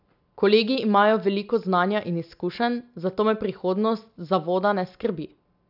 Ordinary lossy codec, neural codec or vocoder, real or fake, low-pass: none; none; real; 5.4 kHz